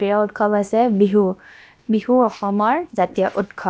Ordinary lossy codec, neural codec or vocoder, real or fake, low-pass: none; codec, 16 kHz, about 1 kbps, DyCAST, with the encoder's durations; fake; none